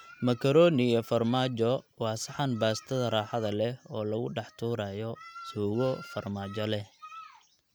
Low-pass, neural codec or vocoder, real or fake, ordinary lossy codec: none; none; real; none